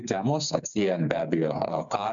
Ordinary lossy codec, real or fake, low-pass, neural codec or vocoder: MP3, 64 kbps; fake; 7.2 kHz; codec, 16 kHz, 4 kbps, FreqCodec, smaller model